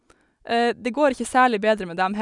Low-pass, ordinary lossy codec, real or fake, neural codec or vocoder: 10.8 kHz; none; real; none